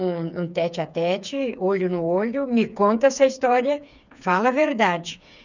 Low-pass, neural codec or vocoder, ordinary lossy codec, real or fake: 7.2 kHz; codec, 16 kHz, 4 kbps, FreqCodec, smaller model; none; fake